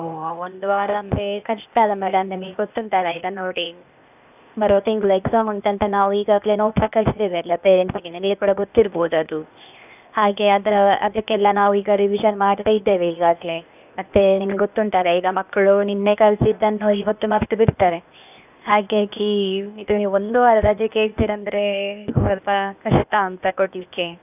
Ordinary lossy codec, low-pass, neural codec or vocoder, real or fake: none; 3.6 kHz; codec, 16 kHz, 0.8 kbps, ZipCodec; fake